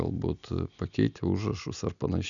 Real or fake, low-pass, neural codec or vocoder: real; 7.2 kHz; none